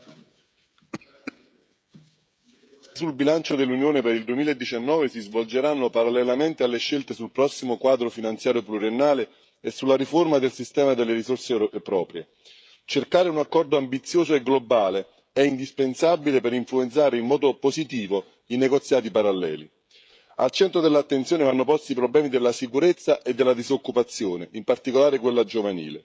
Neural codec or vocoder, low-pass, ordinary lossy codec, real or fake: codec, 16 kHz, 16 kbps, FreqCodec, smaller model; none; none; fake